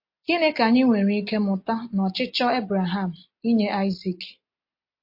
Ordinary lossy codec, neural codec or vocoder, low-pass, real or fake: MP3, 32 kbps; none; 5.4 kHz; real